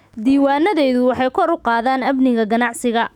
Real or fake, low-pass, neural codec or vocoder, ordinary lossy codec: fake; 19.8 kHz; autoencoder, 48 kHz, 128 numbers a frame, DAC-VAE, trained on Japanese speech; none